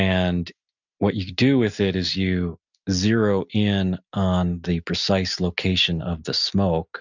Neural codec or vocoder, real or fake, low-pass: none; real; 7.2 kHz